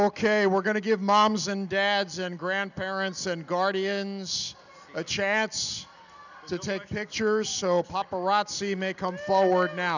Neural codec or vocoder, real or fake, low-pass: none; real; 7.2 kHz